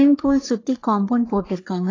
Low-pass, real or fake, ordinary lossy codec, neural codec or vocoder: 7.2 kHz; fake; AAC, 32 kbps; codec, 44.1 kHz, 3.4 kbps, Pupu-Codec